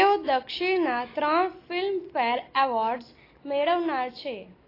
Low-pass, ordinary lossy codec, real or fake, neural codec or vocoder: 5.4 kHz; AAC, 24 kbps; real; none